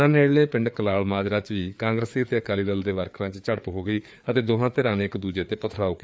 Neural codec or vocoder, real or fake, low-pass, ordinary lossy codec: codec, 16 kHz, 4 kbps, FreqCodec, larger model; fake; none; none